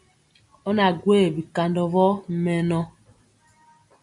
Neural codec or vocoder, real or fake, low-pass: none; real; 10.8 kHz